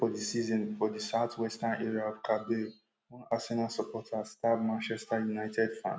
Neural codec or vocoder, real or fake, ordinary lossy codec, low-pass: none; real; none; none